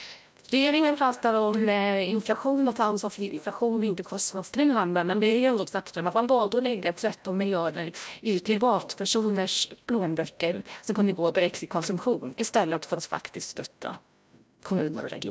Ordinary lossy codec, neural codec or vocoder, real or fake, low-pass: none; codec, 16 kHz, 0.5 kbps, FreqCodec, larger model; fake; none